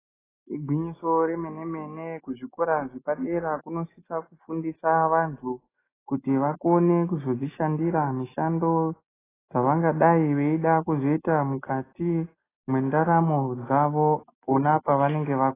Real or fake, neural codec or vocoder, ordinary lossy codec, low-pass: real; none; AAC, 16 kbps; 3.6 kHz